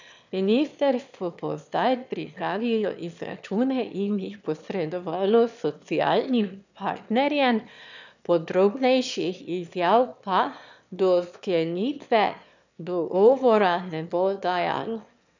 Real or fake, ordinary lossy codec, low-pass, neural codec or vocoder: fake; none; 7.2 kHz; autoencoder, 22.05 kHz, a latent of 192 numbers a frame, VITS, trained on one speaker